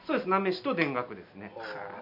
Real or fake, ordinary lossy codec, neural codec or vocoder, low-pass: real; none; none; 5.4 kHz